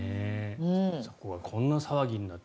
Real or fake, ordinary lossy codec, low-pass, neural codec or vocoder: real; none; none; none